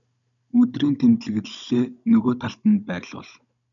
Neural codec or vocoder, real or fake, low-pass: codec, 16 kHz, 16 kbps, FunCodec, trained on Chinese and English, 50 frames a second; fake; 7.2 kHz